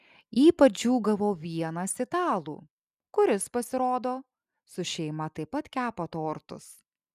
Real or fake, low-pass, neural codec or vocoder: real; 14.4 kHz; none